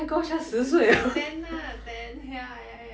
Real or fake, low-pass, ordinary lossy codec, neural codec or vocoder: real; none; none; none